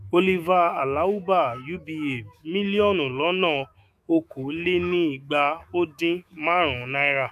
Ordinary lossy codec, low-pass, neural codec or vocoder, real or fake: none; 14.4 kHz; autoencoder, 48 kHz, 128 numbers a frame, DAC-VAE, trained on Japanese speech; fake